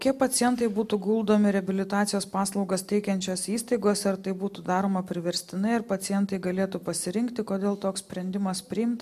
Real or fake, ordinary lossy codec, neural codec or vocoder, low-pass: real; MP3, 96 kbps; none; 14.4 kHz